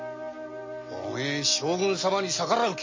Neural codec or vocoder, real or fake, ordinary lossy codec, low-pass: none; real; MP3, 32 kbps; 7.2 kHz